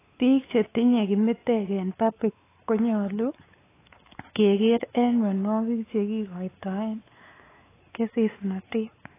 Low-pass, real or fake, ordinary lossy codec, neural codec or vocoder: 3.6 kHz; fake; AAC, 16 kbps; codec, 16 kHz, 4 kbps, X-Codec, WavLM features, trained on Multilingual LibriSpeech